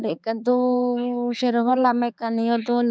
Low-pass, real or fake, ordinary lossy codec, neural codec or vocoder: none; fake; none; codec, 16 kHz, 4 kbps, X-Codec, HuBERT features, trained on balanced general audio